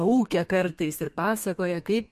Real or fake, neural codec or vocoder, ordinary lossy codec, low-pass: fake; codec, 32 kHz, 1.9 kbps, SNAC; MP3, 64 kbps; 14.4 kHz